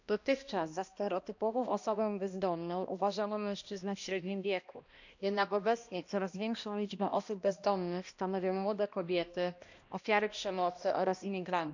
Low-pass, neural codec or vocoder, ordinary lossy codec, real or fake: 7.2 kHz; codec, 16 kHz, 1 kbps, X-Codec, HuBERT features, trained on balanced general audio; none; fake